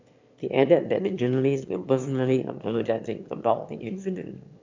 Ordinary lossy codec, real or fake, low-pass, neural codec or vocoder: MP3, 64 kbps; fake; 7.2 kHz; autoencoder, 22.05 kHz, a latent of 192 numbers a frame, VITS, trained on one speaker